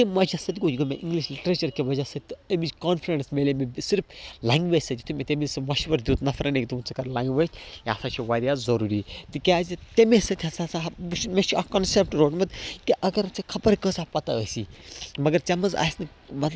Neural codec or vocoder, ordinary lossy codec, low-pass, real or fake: none; none; none; real